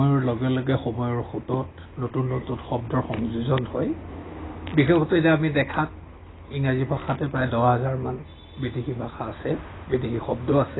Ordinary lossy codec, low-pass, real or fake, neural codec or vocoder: AAC, 16 kbps; 7.2 kHz; real; none